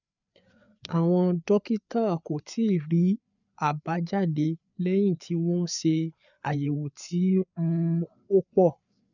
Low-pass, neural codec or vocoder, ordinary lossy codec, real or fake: 7.2 kHz; codec, 16 kHz, 4 kbps, FreqCodec, larger model; none; fake